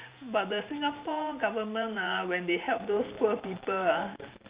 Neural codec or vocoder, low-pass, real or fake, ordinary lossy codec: vocoder, 44.1 kHz, 128 mel bands every 512 samples, BigVGAN v2; 3.6 kHz; fake; Opus, 64 kbps